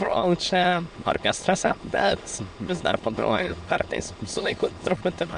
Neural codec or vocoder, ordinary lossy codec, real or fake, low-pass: autoencoder, 22.05 kHz, a latent of 192 numbers a frame, VITS, trained on many speakers; MP3, 64 kbps; fake; 9.9 kHz